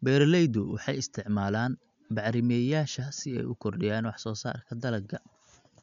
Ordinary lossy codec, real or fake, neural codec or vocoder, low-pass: none; real; none; 7.2 kHz